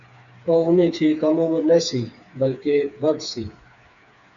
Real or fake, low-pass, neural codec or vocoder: fake; 7.2 kHz; codec, 16 kHz, 4 kbps, FreqCodec, smaller model